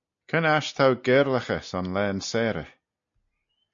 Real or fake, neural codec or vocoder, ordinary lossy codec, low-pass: real; none; AAC, 64 kbps; 7.2 kHz